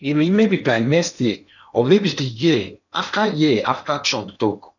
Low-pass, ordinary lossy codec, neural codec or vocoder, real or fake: 7.2 kHz; none; codec, 16 kHz in and 24 kHz out, 0.8 kbps, FocalCodec, streaming, 65536 codes; fake